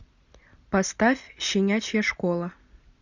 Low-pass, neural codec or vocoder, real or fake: 7.2 kHz; none; real